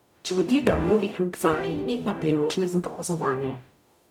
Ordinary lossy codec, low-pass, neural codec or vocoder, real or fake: none; 19.8 kHz; codec, 44.1 kHz, 0.9 kbps, DAC; fake